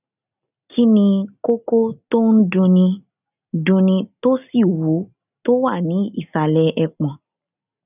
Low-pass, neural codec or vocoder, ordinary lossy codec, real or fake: 3.6 kHz; none; none; real